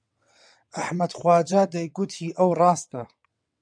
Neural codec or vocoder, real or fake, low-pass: vocoder, 22.05 kHz, 80 mel bands, WaveNeXt; fake; 9.9 kHz